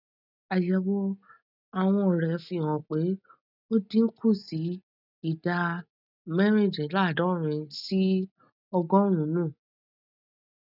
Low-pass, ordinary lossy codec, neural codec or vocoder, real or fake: 5.4 kHz; none; none; real